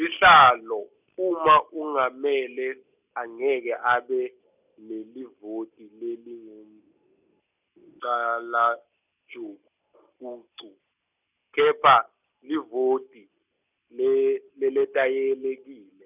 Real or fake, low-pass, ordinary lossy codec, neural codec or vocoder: real; 3.6 kHz; none; none